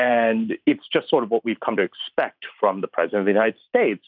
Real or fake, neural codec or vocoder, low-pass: fake; vocoder, 44.1 kHz, 128 mel bands every 512 samples, BigVGAN v2; 5.4 kHz